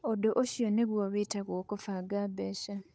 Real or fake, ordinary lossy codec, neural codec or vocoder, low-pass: fake; none; codec, 16 kHz, 8 kbps, FunCodec, trained on Chinese and English, 25 frames a second; none